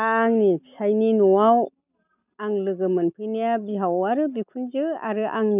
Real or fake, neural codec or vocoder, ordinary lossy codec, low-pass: real; none; none; 3.6 kHz